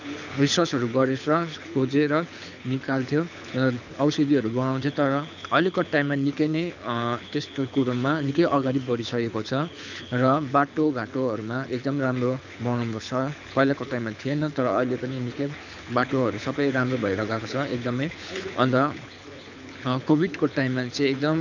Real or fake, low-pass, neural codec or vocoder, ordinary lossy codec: fake; 7.2 kHz; codec, 24 kHz, 6 kbps, HILCodec; none